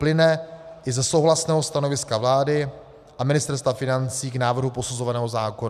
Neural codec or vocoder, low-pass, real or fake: none; 14.4 kHz; real